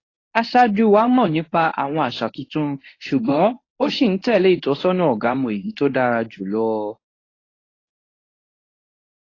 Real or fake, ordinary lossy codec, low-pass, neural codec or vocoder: fake; AAC, 32 kbps; 7.2 kHz; codec, 24 kHz, 0.9 kbps, WavTokenizer, medium speech release version 1